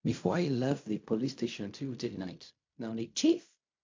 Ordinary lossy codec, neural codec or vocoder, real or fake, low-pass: MP3, 48 kbps; codec, 16 kHz in and 24 kHz out, 0.4 kbps, LongCat-Audio-Codec, fine tuned four codebook decoder; fake; 7.2 kHz